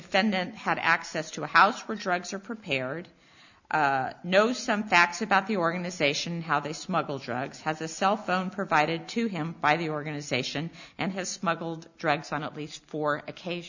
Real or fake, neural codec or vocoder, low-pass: real; none; 7.2 kHz